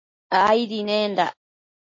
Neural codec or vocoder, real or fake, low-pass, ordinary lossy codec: none; real; 7.2 kHz; MP3, 32 kbps